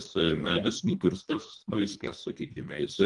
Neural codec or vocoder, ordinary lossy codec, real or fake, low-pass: codec, 24 kHz, 1.5 kbps, HILCodec; Opus, 32 kbps; fake; 10.8 kHz